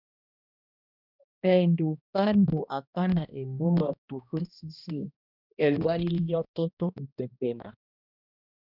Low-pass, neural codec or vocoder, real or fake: 5.4 kHz; codec, 16 kHz, 1 kbps, X-Codec, HuBERT features, trained on balanced general audio; fake